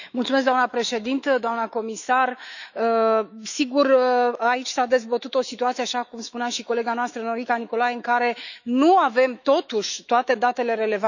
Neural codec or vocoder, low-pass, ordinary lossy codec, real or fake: autoencoder, 48 kHz, 128 numbers a frame, DAC-VAE, trained on Japanese speech; 7.2 kHz; none; fake